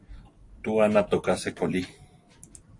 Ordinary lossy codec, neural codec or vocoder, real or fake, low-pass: AAC, 64 kbps; vocoder, 44.1 kHz, 128 mel bands every 256 samples, BigVGAN v2; fake; 10.8 kHz